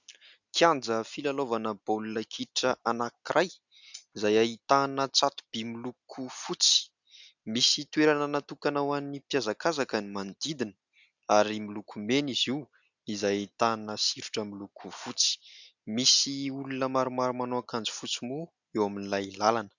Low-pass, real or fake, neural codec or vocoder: 7.2 kHz; real; none